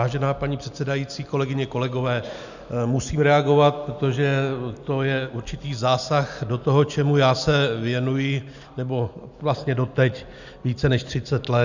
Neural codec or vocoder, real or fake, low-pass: none; real; 7.2 kHz